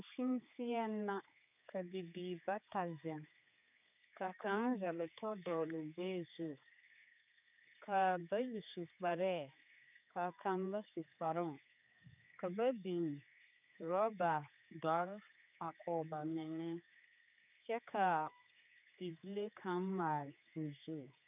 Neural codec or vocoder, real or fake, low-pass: codec, 16 kHz, 4 kbps, X-Codec, HuBERT features, trained on general audio; fake; 3.6 kHz